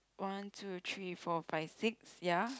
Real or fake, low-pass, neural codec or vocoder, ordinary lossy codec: real; none; none; none